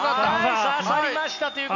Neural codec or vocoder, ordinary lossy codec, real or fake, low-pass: none; none; real; 7.2 kHz